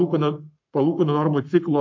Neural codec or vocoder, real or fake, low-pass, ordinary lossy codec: codec, 44.1 kHz, 7.8 kbps, Pupu-Codec; fake; 7.2 kHz; MP3, 48 kbps